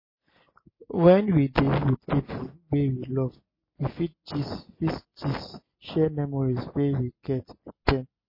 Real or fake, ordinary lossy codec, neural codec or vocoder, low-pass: real; MP3, 24 kbps; none; 5.4 kHz